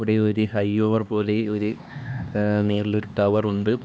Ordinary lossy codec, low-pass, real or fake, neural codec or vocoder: none; none; fake; codec, 16 kHz, 2 kbps, X-Codec, HuBERT features, trained on LibriSpeech